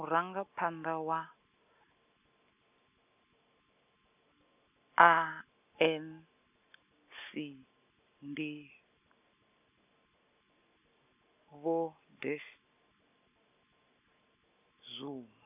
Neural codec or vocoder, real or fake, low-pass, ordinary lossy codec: none; real; 3.6 kHz; none